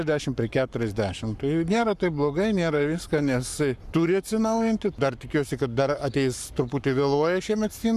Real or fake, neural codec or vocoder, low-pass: fake; codec, 44.1 kHz, 7.8 kbps, Pupu-Codec; 14.4 kHz